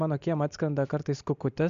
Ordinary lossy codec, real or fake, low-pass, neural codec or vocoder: MP3, 64 kbps; real; 7.2 kHz; none